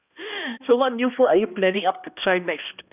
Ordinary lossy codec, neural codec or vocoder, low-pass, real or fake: none; codec, 16 kHz, 1 kbps, X-Codec, HuBERT features, trained on balanced general audio; 3.6 kHz; fake